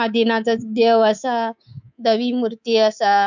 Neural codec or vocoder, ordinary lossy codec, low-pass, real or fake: codec, 24 kHz, 3.1 kbps, DualCodec; none; 7.2 kHz; fake